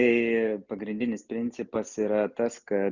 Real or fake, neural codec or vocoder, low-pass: real; none; 7.2 kHz